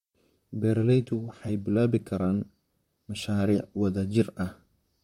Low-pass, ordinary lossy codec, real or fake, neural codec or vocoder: 19.8 kHz; MP3, 64 kbps; fake; vocoder, 44.1 kHz, 128 mel bands, Pupu-Vocoder